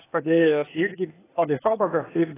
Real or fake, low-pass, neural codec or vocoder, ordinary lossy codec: fake; 3.6 kHz; codec, 16 kHz, 0.8 kbps, ZipCodec; AAC, 16 kbps